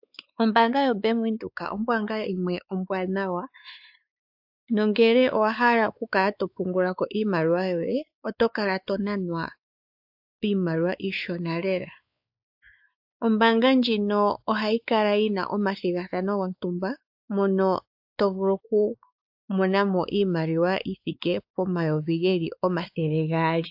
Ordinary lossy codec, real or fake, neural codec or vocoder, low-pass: AAC, 48 kbps; fake; codec, 16 kHz, 4 kbps, X-Codec, WavLM features, trained on Multilingual LibriSpeech; 5.4 kHz